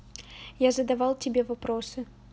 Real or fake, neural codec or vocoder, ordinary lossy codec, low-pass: real; none; none; none